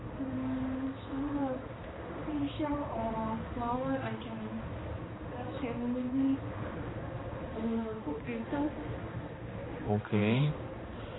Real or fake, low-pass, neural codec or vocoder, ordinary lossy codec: fake; 7.2 kHz; codec, 16 kHz, 2 kbps, X-Codec, HuBERT features, trained on balanced general audio; AAC, 16 kbps